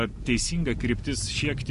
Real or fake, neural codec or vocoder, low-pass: real; none; 10.8 kHz